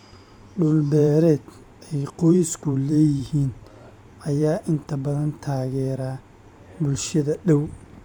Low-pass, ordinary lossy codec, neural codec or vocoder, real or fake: 19.8 kHz; MP3, 96 kbps; vocoder, 44.1 kHz, 128 mel bands every 512 samples, BigVGAN v2; fake